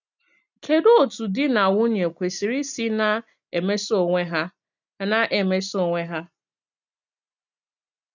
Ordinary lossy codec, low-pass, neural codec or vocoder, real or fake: none; 7.2 kHz; none; real